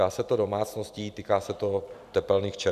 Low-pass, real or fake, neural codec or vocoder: 14.4 kHz; real; none